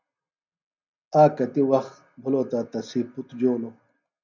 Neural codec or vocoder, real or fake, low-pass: none; real; 7.2 kHz